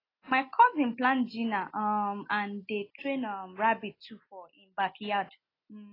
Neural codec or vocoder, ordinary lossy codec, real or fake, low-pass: none; AAC, 24 kbps; real; 5.4 kHz